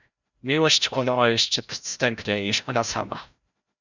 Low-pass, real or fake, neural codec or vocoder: 7.2 kHz; fake; codec, 16 kHz, 0.5 kbps, FreqCodec, larger model